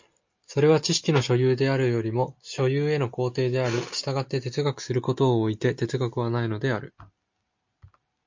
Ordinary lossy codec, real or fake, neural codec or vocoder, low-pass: MP3, 48 kbps; real; none; 7.2 kHz